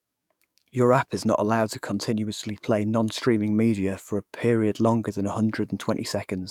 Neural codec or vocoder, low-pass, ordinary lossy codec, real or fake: codec, 44.1 kHz, 7.8 kbps, DAC; 19.8 kHz; none; fake